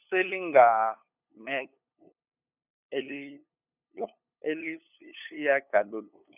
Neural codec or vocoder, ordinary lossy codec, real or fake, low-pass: codec, 16 kHz, 8 kbps, FunCodec, trained on LibriTTS, 25 frames a second; none; fake; 3.6 kHz